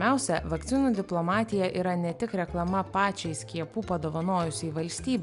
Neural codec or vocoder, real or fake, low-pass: none; real; 10.8 kHz